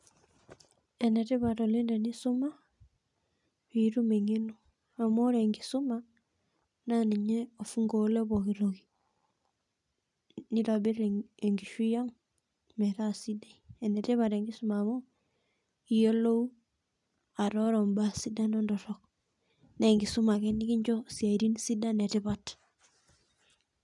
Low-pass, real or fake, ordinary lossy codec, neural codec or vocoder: 10.8 kHz; real; none; none